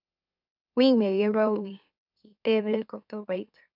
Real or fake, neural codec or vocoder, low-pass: fake; autoencoder, 44.1 kHz, a latent of 192 numbers a frame, MeloTTS; 5.4 kHz